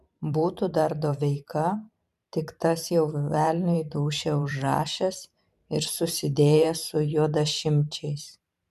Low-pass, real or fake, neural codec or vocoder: 14.4 kHz; fake; vocoder, 44.1 kHz, 128 mel bands every 512 samples, BigVGAN v2